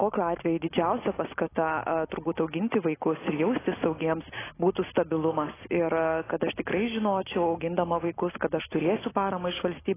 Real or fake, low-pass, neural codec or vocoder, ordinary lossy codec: real; 3.6 kHz; none; AAC, 16 kbps